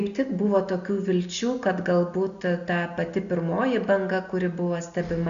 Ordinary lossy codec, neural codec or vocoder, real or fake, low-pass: AAC, 64 kbps; none; real; 7.2 kHz